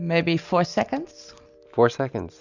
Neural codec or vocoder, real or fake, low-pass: none; real; 7.2 kHz